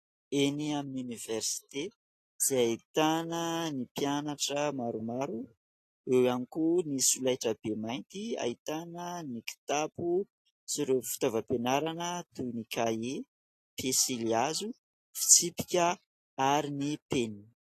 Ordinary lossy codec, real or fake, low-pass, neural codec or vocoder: AAC, 48 kbps; real; 14.4 kHz; none